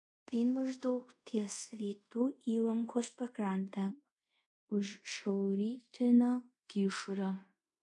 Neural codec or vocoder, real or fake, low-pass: codec, 24 kHz, 0.5 kbps, DualCodec; fake; 10.8 kHz